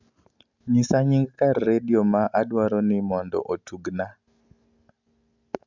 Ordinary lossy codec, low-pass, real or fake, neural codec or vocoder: MP3, 64 kbps; 7.2 kHz; real; none